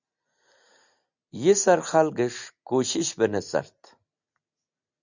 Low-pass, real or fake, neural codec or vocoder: 7.2 kHz; real; none